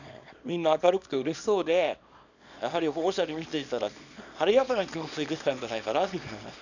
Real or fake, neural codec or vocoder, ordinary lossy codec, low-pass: fake; codec, 24 kHz, 0.9 kbps, WavTokenizer, small release; none; 7.2 kHz